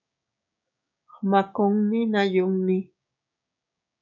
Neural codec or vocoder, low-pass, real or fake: codec, 16 kHz, 6 kbps, DAC; 7.2 kHz; fake